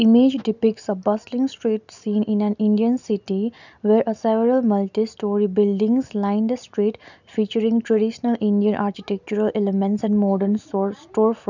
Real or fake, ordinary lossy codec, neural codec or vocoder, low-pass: real; none; none; 7.2 kHz